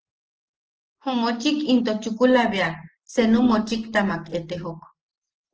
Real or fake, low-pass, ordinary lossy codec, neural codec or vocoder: real; 7.2 kHz; Opus, 16 kbps; none